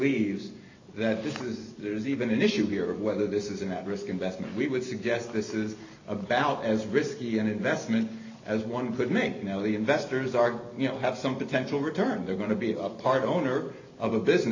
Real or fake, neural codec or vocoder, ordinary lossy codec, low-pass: real; none; AAC, 48 kbps; 7.2 kHz